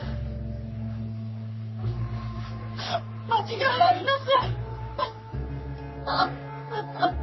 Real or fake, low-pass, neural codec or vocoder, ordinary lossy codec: fake; 7.2 kHz; codec, 44.1 kHz, 3.4 kbps, Pupu-Codec; MP3, 24 kbps